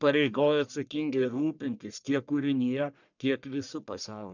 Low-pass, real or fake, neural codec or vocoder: 7.2 kHz; fake; codec, 44.1 kHz, 1.7 kbps, Pupu-Codec